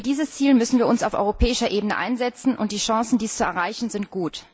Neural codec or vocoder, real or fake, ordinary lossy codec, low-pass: none; real; none; none